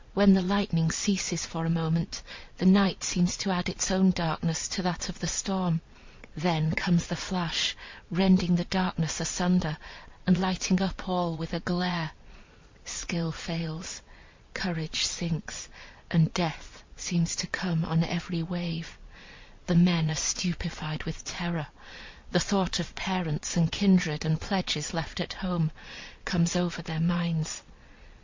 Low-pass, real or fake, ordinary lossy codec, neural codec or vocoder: 7.2 kHz; fake; MP3, 48 kbps; vocoder, 22.05 kHz, 80 mel bands, Vocos